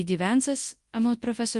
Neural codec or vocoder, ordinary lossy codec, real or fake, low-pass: codec, 24 kHz, 0.9 kbps, WavTokenizer, large speech release; Opus, 32 kbps; fake; 10.8 kHz